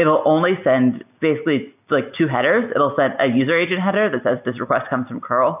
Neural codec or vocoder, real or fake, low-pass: none; real; 3.6 kHz